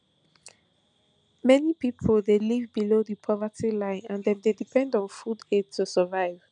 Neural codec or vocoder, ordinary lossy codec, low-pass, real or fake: none; none; 9.9 kHz; real